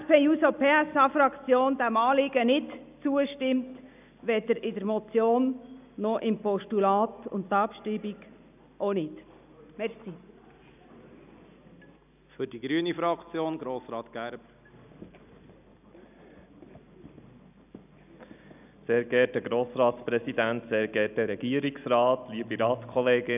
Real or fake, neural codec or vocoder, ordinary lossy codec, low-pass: real; none; none; 3.6 kHz